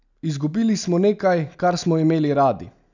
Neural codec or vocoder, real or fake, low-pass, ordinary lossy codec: none; real; 7.2 kHz; none